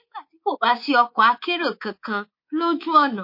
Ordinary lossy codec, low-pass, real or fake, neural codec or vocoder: MP3, 32 kbps; 5.4 kHz; fake; codec, 24 kHz, 3.1 kbps, DualCodec